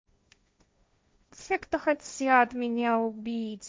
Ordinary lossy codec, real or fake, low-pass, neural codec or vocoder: none; fake; none; codec, 16 kHz, 1.1 kbps, Voila-Tokenizer